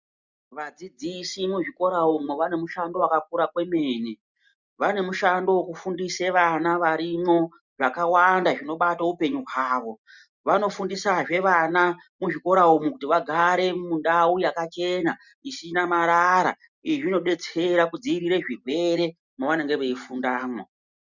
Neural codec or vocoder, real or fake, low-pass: none; real; 7.2 kHz